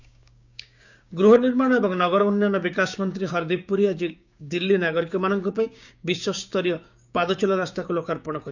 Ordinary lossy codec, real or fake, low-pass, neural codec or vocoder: none; fake; 7.2 kHz; codec, 16 kHz, 6 kbps, DAC